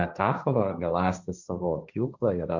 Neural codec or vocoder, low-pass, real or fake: codec, 16 kHz, 1.1 kbps, Voila-Tokenizer; 7.2 kHz; fake